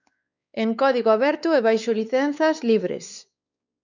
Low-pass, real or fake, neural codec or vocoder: 7.2 kHz; fake; codec, 16 kHz, 4 kbps, X-Codec, WavLM features, trained on Multilingual LibriSpeech